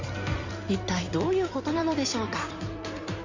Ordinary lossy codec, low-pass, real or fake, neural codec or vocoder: Opus, 64 kbps; 7.2 kHz; fake; codec, 16 kHz in and 24 kHz out, 2.2 kbps, FireRedTTS-2 codec